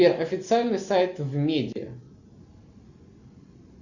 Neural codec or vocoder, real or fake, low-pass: none; real; 7.2 kHz